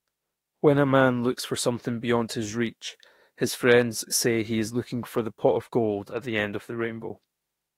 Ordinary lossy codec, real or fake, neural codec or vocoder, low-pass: AAC, 48 kbps; fake; autoencoder, 48 kHz, 32 numbers a frame, DAC-VAE, trained on Japanese speech; 19.8 kHz